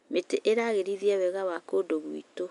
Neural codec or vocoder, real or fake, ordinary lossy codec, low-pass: none; real; none; 10.8 kHz